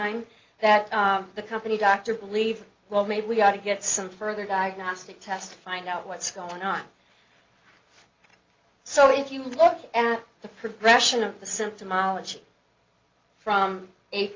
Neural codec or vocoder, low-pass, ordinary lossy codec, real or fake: none; 7.2 kHz; Opus, 24 kbps; real